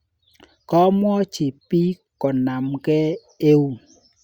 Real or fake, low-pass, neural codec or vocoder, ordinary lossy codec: real; 19.8 kHz; none; Opus, 64 kbps